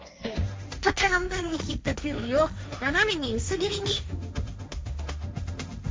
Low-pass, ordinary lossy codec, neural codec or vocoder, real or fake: none; none; codec, 16 kHz, 1.1 kbps, Voila-Tokenizer; fake